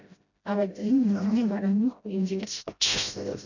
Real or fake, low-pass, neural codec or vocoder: fake; 7.2 kHz; codec, 16 kHz, 0.5 kbps, FreqCodec, smaller model